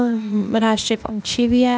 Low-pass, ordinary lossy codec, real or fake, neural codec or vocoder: none; none; fake; codec, 16 kHz, 0.8 kbps, ZipCodec